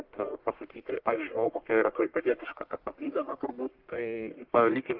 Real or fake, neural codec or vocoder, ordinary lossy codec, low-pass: fake; codec, 44.1 kHz, 1.7 kbps, Pupu-Codec; Opus, 32 kbps; 5.4 kHz